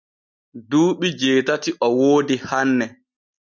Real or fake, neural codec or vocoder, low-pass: real; none; 7.2 kHz